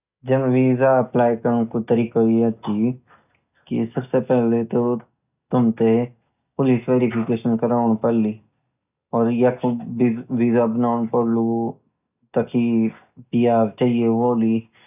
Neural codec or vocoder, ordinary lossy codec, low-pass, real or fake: none; none; 3.6 kHz; real